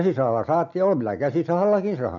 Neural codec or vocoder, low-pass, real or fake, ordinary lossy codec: none; 7.2 kHz; real; none